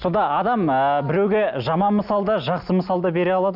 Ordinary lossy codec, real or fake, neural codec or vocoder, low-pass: none; real; none; 5.4 kHz